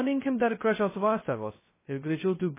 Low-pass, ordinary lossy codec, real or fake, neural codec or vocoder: 3.6 kHz; MP3, 16 kbps; fake; codec, 16 kHz, 0.2 kbps, FocalCodec